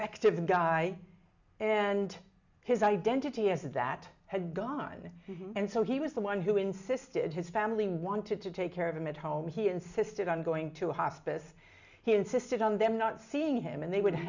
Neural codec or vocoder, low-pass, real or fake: none; 7.2 kHz; real